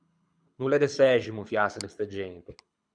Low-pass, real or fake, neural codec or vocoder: 9.9 kHz; fake; codec, 24 kHz, 6 kbps, HILCodec